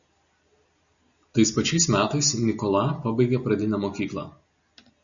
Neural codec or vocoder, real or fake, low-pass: none; real; 7.2 kHz